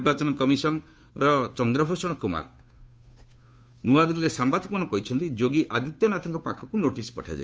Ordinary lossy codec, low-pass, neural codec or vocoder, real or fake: none; none; codec, 16 kHz, 2 kbps, FunCodec, trained on Chinese and English, 25 frames a second; fake